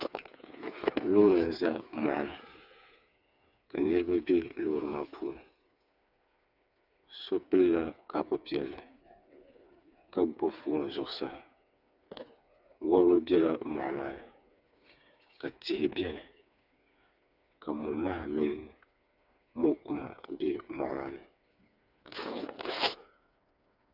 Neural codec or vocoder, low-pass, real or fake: codec, 16 kHz, 4 kbps, FreqCodec, smaller model; 5.4 kHz; fake